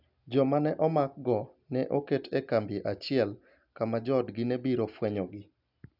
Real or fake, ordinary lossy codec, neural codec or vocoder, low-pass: real; none; none; 5.4 kHz